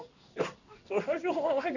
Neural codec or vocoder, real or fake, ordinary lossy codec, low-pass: codec, 16 kHz in and 24 kHz out, 1 kbps, XY-Tokenizer; fake; none; 7.2 kHz